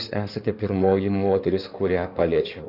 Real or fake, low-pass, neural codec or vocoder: fake; 5.4 kHz; codec, 16 kHz in and 24 kHz out, 2.2 kbps, FireRedTTS-2 codec